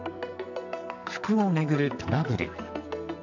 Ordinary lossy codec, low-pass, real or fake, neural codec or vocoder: none; 7.2 kHz; fake; codec, 44.1 kHz, 2.6 kbps, SNAC